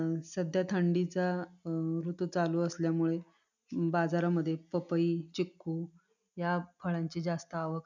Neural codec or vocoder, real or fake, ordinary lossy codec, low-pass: none; real; none; 7.2 kHz